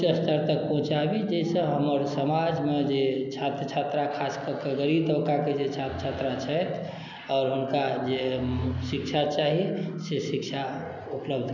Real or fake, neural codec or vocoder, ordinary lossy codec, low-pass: real; none; none; 7.2 kHz